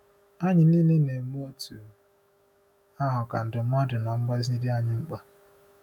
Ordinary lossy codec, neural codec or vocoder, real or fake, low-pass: none; autoencoder, 48 kHz, 128 numbers a frame, DAC-VAE, trained on Japanese speech; fake; 19.8 kHz